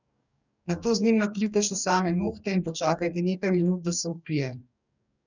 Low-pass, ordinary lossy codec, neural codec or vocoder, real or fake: 7.2 kHz; none; codec, 44.1 kHz, 2.6 kbps, DAC; fake